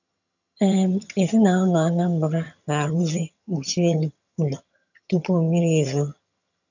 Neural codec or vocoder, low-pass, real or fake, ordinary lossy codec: vocoder, 22.05 kHz, 80 mel bands, HiFi-GAN; 7.2 kHz; fake; none